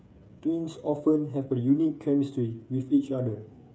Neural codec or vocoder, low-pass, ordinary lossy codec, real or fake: codec, 16 kHz, 8 kbps, FreqCodec, smaller model; none; none; fake